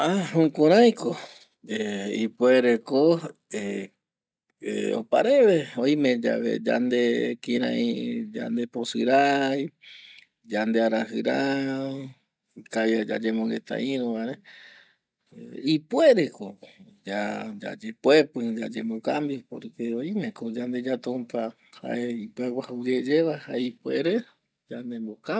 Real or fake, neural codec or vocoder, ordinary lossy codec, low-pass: real; none; none; none